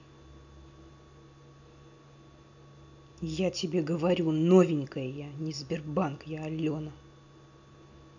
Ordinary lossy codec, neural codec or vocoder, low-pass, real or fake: none; none; 7.2 kHz; real